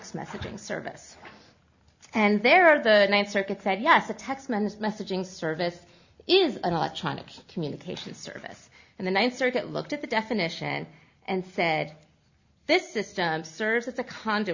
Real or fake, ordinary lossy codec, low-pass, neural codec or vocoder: real; Opus, 64 kbps; 7.2 kHz; none